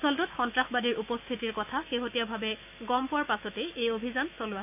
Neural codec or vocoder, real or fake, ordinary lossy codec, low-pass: autoencoder, 48 kHz, 128 numbers a frame, DAC-VAE, trained on Japanese speech; fake; none; 3.6 kHz